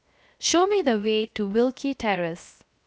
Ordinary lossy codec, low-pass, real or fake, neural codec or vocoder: none; none; fake; codec, 16 kHz, 0.7 kbps, FocalCodec